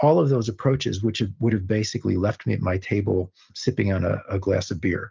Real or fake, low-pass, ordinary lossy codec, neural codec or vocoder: real; 7.2 kHz; Opus, 24 kbps; none